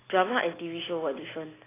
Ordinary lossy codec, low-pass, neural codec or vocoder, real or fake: AAC, 16 kbps; 3.6 kHz; none; real